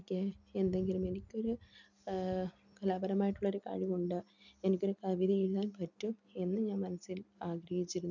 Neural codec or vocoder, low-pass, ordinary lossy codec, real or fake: none; 7.2 kHz; none; real